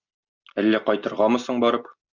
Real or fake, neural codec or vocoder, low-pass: real; none; 7.2 kHz